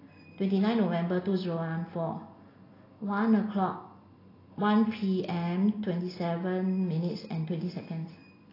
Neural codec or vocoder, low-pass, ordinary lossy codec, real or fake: none; 5.4 kHz; AAC, 24 kbps; real